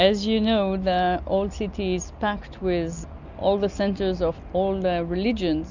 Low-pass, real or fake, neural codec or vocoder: 7.2 kHz; real; none